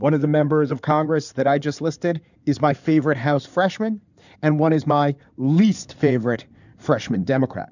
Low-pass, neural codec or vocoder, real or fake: 7.2 kHz; codec, 16 kHz in and 24 kHz out, 2.2 kbps, FireRedTTS-2 codec; fake